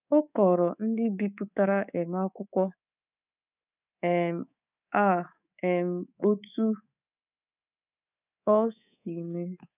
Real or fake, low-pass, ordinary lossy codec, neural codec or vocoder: fake; 3.6 kHz; none; codec, 24 kHz, 3.1 kbps, DualCodec